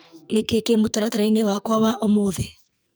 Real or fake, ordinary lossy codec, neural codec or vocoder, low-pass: fake; none; codec, 44.1 kHz, 2.6 kbps, SNAC; none